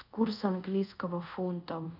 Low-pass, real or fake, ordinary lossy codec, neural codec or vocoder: 5.4 kHz; fake; none; codec, 24 kHz, 0.5 kbps, DualCodec